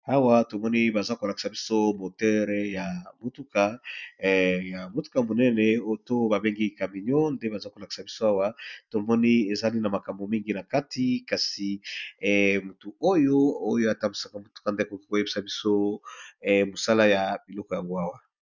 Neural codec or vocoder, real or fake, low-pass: none; real; 7.2 kHz